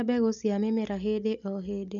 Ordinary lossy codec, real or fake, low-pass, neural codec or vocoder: none; real; 7.2 kHz; none